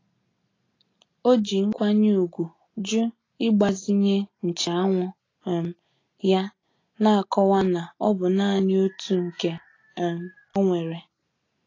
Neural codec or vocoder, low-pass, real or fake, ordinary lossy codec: none; 7.2 kHz; real; AAC, 32 kbps